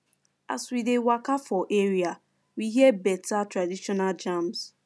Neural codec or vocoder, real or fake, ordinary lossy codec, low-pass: none; real; none; none